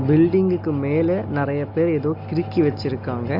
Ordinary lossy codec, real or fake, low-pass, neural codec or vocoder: MP3, 48 kbps; real; 5.4 kHz; none